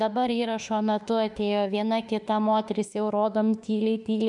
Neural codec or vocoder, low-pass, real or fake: autoencoder, 48 kHz, 32 numbers a frame, DAC-VAE, trained on Japanese speech; 10.8 kHz; fake